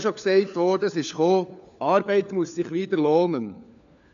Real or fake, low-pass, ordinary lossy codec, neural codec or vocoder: fake; 7.2 kHz; AAC, 96 kbps; codec, 16 kHz, 4 kbps, FunCodec, trained on LibriTTS, 50 frames a second